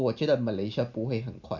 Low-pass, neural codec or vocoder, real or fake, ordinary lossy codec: 7.2 kHz; none; real; none